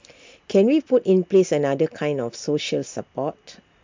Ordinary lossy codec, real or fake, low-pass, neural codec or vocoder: MP3, 64 kbps; real; 7.2 kHz; none